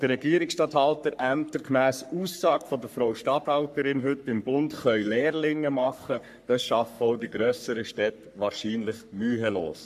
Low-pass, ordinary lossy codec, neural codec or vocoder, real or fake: 14.4 kHz; MP3, 96 kbps; codec, 44.1 kHz, 3.4 kbps, Pupu-Codec; fake